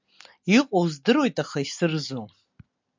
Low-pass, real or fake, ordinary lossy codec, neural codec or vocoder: 7.2 kHz; real; MP3, 64 kbps; none